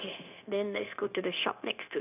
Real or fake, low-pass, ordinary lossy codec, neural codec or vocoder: fake; 3.6 kHz; none; codec, 16 kHz, 0.9 kbps, LongCat-Audio-Codec